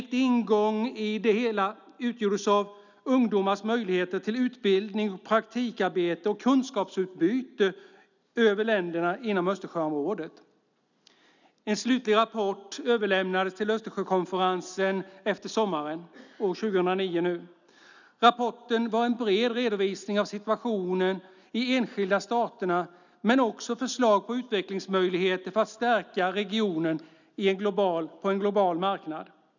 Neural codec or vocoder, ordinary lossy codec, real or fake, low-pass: none; none; real; 7.2 kHz